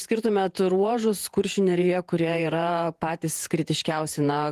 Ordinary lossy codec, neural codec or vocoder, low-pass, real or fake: Opus, 24 kbps; vocoder, 48 kHz, 128 mel bands, Vocos; 14.4 kHz; fake